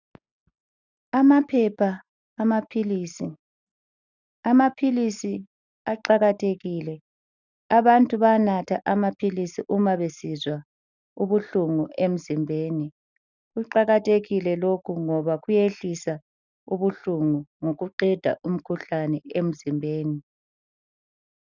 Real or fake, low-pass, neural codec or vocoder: real; 7.2 kHz; none